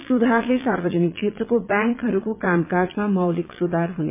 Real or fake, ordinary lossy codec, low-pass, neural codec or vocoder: fake; MP3, 24 kbps; 3.6 kHz; vocoder, 22.05 kHz, 80 mel bands, Vocos